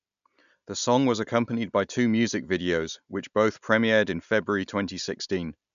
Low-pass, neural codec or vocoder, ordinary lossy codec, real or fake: 7.2 kHz; none; none; real